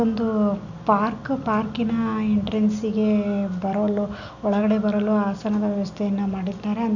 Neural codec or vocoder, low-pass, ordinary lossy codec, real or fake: none; 7.2 kHz; none; real